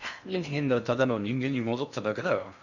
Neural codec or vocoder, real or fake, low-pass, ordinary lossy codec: codec, 16 kHz in and 24 kHz out, 0.6 kbps, FocalCodec, streaming, 2048 codes; fake; 7.2 kHz; none